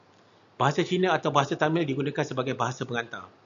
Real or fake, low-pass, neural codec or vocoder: real; 7.2 kHz; none